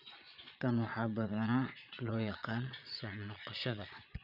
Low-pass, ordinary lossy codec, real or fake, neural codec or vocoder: 5.4 kHz; none; fake; vocoder, 22.05 kHz, 80 mel bands, Vocos